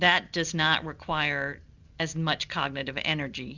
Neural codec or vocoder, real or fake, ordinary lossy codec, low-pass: none; real; Opus, 64 kbps; 7.2 kHz